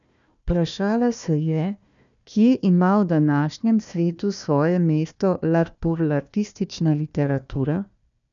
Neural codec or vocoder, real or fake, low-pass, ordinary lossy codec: codec, 16 kHz, 1 kbps, FunCodec, trained on Chinese and English, 50 frames a second; fake; 7.2 kHz; none